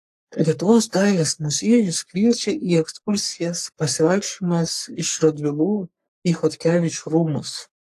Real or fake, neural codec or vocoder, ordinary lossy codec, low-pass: fake; codec, 44.1 kHz, 3.4 kbps, Pupu-Codec; AAC, 64 kbps; 14.4 kHz